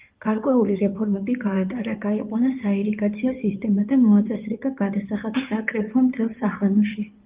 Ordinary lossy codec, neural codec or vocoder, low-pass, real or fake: Opus, 32 kbps; codec, 16 kHz in and 24 kHz out, 2.2 kbps, FireRedTTS-2 codec; 3.6 kHz; fake